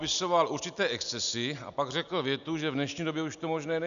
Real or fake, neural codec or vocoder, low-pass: real; none; 7.2 kHz